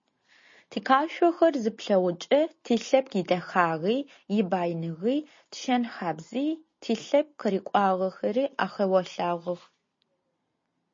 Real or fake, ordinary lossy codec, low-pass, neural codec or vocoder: real; MP3, 32 kbps; 7.2 kHz; none